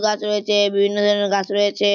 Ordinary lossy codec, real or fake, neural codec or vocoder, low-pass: none; real; none; 7.2 kHz